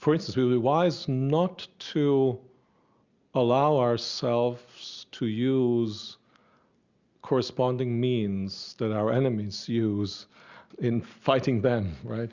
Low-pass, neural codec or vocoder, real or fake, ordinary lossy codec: 7.2 kHz; none; real; Opus, 64 kbps